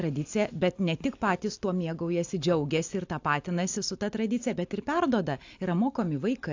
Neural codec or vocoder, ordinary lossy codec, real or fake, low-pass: none; AAC, 48 kbps; real; 7.2 kHz